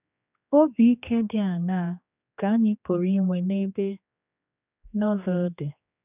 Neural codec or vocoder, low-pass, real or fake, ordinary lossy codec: codec, 16 kHz, 2 kbps, X-Codec, HuBERT features, trained on general audio; 3.6 kHz; fake; none